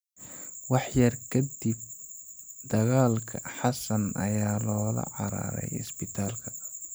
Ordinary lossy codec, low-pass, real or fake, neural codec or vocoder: none; none; real; none